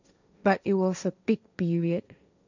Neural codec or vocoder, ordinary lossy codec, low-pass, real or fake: codec, 16 kHz, 1.1 kbps, Voila-Tokenizer; none; 7.2 kHz; fake